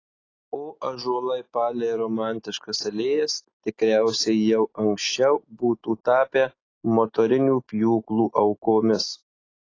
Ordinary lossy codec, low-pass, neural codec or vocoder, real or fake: AAC, 32 kbps; 7.2 kHz; none; real